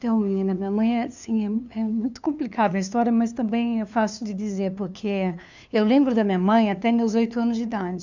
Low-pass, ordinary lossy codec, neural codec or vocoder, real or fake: 7.2 kHz; none; codec, 16 kHz, 2 kbps, FunCodec, trained on LibriTTS, 25 frames a second; fake